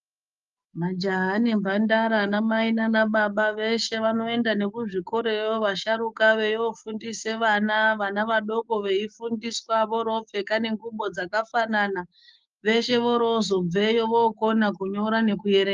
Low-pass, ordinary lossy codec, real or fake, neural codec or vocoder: 7.2 kHz; Opus, 32 kbps; fake; codec, 16 kHz, 6 kbps, DAC